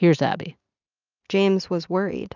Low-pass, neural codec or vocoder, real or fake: 7.2 kHz; none; real